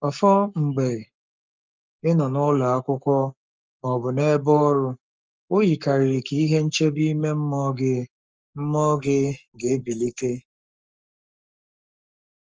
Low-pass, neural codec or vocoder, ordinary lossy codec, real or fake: 7.2 kHz; codec, 44.1 kHz, 7.8 kbps, Pupu-Codec; Opus, 24 kbps; fake